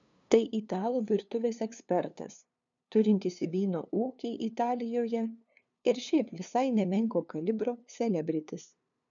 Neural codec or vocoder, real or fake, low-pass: codec, 16 kHz, 2 kbps, FunCodec, trained on LibriTTS, 25 frames a second; fake; 7.2 kHz